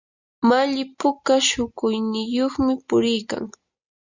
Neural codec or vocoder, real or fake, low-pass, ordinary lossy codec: none; real; 7.2 kHz; Opus, 64 kbps